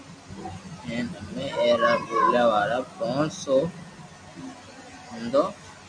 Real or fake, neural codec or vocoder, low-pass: real; none; 9.9 kHz